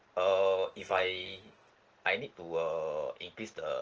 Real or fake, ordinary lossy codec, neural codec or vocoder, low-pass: real; Opus, 16 kbps; none; 7.2 kHz